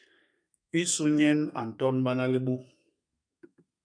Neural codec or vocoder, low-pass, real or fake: codec, 32 kHz, 1.9 kbps, SNAC; 9.9 kHz; fake